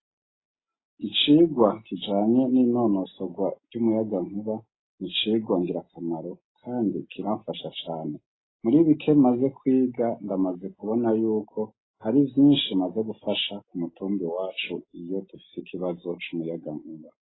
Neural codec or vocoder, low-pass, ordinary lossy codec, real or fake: none; 7.2 kHz; AAC, 16 kbps; real